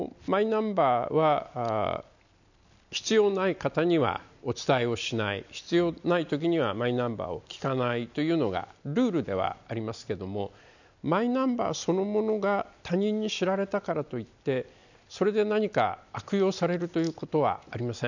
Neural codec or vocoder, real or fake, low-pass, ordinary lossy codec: none; real; 7.2 kHz; none